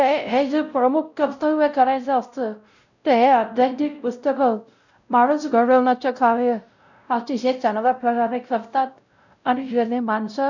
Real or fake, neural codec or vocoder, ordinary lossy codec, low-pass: fake; codec, 16 kHz, 0.5 kbps, X-Codec, WavLM features, trained on Multilingual LibriSpeech; none; 7.2 kHz